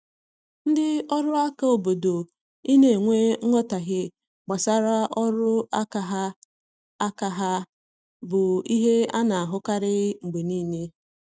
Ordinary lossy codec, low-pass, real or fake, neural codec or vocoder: none; none; real; none